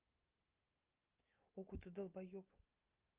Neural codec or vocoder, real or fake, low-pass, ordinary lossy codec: none; real; 3.6 kHz; Opus, 24 kbps